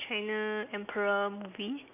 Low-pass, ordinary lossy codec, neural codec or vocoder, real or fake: 3.6 kHz; none; none; real